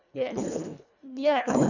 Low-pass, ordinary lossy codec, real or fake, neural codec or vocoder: 7.2 kHz; none; fake; codec, 24 kHz, 1.5 kbps, HILCodec